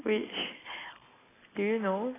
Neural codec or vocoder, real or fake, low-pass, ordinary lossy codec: none; real; 3.6 kHz; AAC, 16 kbps